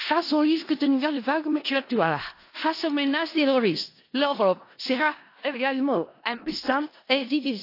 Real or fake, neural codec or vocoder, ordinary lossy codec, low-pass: fake; codec, 16 kHz in and 24 kHz out, 0.4 kbps, LongCat-Audio-Codec, four codebook decoder; AAC, 32 kbps; 5.4 kHz